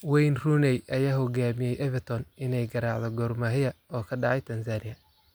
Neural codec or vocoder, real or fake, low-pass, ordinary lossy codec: none; real; none; none